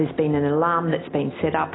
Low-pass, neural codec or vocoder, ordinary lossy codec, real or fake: 7.2 kHz; none; AAC, 16 kbps; real